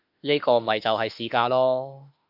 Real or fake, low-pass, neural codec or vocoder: fake; 5.4 kHz; autoencoder, 48 kHz, 32 numbers a frame, DAC-VAE, trained on Japanese speech